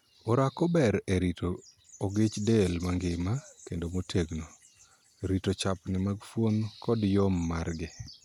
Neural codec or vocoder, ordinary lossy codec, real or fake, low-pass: none; none; real; 19.8 kHz